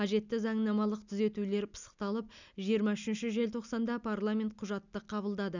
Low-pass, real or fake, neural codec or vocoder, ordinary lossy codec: 7.2 kHz; real; none; none